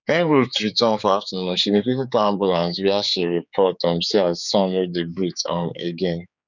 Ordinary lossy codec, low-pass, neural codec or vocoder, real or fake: none; 7.2 kHz; codec, 16 kHz, 4 kbps, X-Codec, HuBERT features, trained on general audio; fake